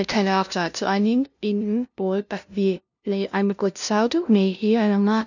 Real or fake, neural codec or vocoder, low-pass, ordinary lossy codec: fake; codec, 16 kHz, 0.5 kbps, FunCodec, trained on LibriTTS, 25 frames a second; 7.2 kHz; none